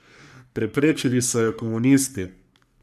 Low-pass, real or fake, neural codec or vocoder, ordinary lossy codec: 14.4 kHz; fake; codec, 44.1 kHz, 3.4 kbps, Pupu-Codec; none